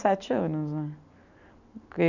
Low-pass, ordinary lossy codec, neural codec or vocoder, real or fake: 7.2 kHz; none; none; real